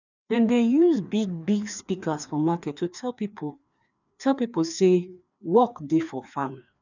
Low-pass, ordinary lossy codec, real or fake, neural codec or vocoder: 7.2 kHz; none; fake; codec, 16 kHz, 2 kbps, FreqCodec, larger model